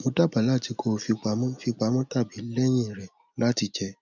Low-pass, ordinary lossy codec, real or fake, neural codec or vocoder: 7.2 kHz; none; real; none